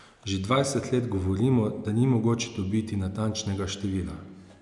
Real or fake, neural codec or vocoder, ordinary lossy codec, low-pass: real; none; none; 10.8 kHz